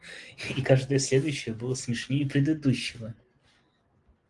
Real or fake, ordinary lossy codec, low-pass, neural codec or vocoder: real; Opus, 24 kbps; 10.8 kHz; none